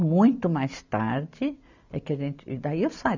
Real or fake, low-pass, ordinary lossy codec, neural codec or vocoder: real; 7.2 kHz; none; none